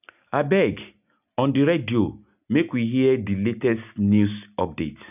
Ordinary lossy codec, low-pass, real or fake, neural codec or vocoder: none; 3.6 kHz; real; none